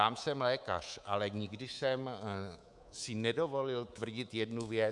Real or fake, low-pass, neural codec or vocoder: fake; 10.8 kHz; codec, 24 kHz, 3.1 kbps, DualCodec